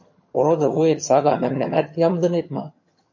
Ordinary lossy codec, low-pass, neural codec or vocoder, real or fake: MP3, 32 kbps; 7.2 kHz; vocoder, 22.05 kHz, 80 mel bands, HiFi-GAN; fake